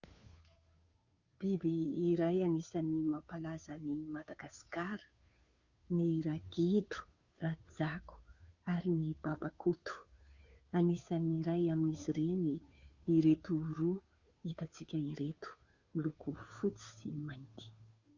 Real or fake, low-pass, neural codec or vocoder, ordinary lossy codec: fake; 7.2 kHz; codec, 16 kHz, 2 kbps, FunCodec, trained on Chinese and English, 25 frames a second; Opus, 64 kbps